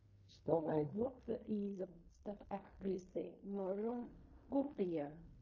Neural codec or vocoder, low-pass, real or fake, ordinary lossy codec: codec, 16 kHz in and 24 kHz out, 0.4 kbps, LongCat-Audio-Codec, fine tuned four codebook decoder; 7.2 kHz; fake; MP3, 32 kbps